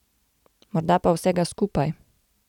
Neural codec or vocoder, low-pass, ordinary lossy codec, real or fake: none; 19.8 kHz; none; real